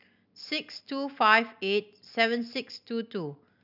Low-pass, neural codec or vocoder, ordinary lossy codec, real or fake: 5.4 kHz; none; none; real